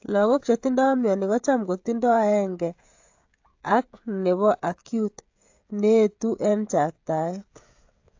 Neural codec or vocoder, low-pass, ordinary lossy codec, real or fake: codec, 16 kHz, 16 kbps, FreqCodec, smaller model; 7.2 kHz; AAC, 48 kbps; fake